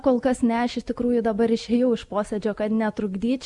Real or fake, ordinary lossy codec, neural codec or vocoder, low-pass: real; Opus, 64 kbps; none; 10.8 kHz